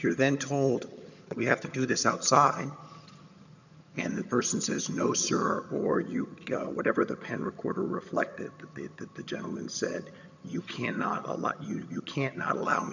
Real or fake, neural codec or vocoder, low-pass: fake; vocoder, 22.05 kHz, 80 mel bands, HiFi-GAN; 7.2 kHz